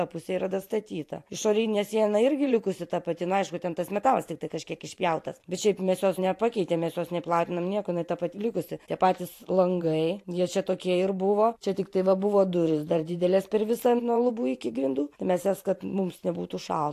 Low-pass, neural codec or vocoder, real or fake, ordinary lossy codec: 14.4 kHz; vocoder, 44.1 kHz, 128 mel bands every 512 samples, BigVGAN v2; fake; AAC, 64 kbps